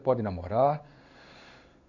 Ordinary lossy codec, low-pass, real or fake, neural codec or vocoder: Opus, 64 kbps; 7.2 kHz; fake; codec, 16 kHz in and 24 kHz out, 1 kbps, XY-Tokenizer